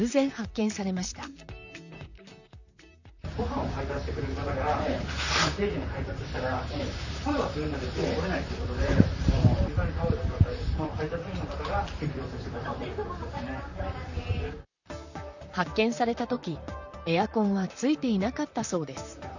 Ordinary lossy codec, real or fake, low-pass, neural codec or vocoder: none; fake; 7.2 kHz; vocoder, 44.1 kHz, 128 mel bands, Pupu-Vocoder